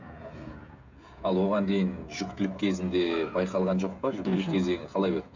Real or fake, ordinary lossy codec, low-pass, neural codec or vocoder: fake; none; 7.2 kHz; codec, 16 kHz, 16 kbps, FreqCodec, smaller model